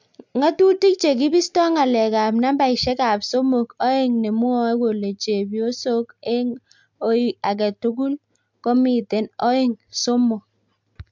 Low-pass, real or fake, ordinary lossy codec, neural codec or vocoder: 7.2 kHz; real; MP3, 64 kbps; none